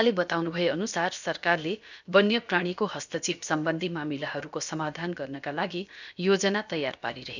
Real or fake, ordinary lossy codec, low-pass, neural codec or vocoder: fake; none; 7.2 kHz; codec, 16 kHz, about 1 kbps, DyCAST, with the encoder's durations